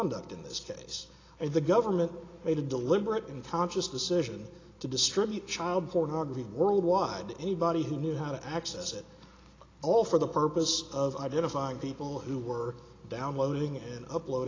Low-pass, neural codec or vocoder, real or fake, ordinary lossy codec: 7.2 kHz; none; real; AAC, 32 kbps